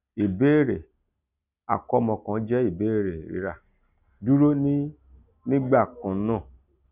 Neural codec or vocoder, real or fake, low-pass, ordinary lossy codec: none; real; 3.6 kHz; none